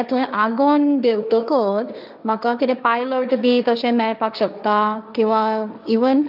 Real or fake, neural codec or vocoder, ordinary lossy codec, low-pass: fake; codec, 16 kHz, 1.1 kbps, Voila-Tokenizer; none; 5.4 kHz